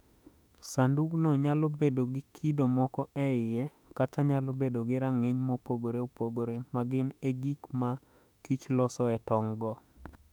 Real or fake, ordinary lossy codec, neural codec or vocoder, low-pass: fake; none; autoencoder, 48 kHz, 32 numbers a frame, DAC-VAE, trained on Japanese speech; 19.8 kHz